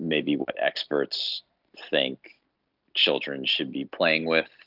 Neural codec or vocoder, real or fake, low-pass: none; real; 5.4 kHz